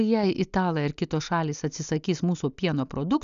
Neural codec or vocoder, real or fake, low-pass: none; real; 7.2 kHz